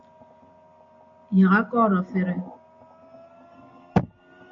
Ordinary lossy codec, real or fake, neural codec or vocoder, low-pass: MP3, 48 kbps; real; none; 7.2 kHz